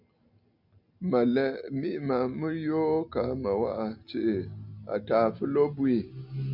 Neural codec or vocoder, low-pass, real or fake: none; 5.4 kHz; real